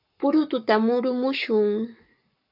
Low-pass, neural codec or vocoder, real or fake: 5.4 kHz; codec, 44.1 kHz, 7.8 kbps, Pupu-Codec; fake